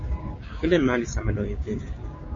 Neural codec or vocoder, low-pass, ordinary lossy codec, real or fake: codec, 16 kHz, 6 kbps, DAC; 7.2 kHz; MP3, 32 kbps; fake